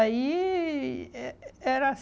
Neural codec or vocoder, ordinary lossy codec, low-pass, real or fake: none; none; none; real